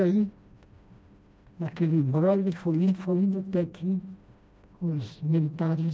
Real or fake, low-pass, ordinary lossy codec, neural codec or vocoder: fake; none; none; codec, 16 kHz, 1 kbps, FreqCodec, smaller model